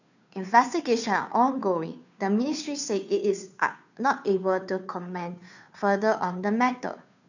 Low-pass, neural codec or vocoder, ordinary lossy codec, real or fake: 7.2 kHz; codec, 16 kHz, 2 kbps, FunCodec, trained on Chinese and English, 25 frames a second; none; fake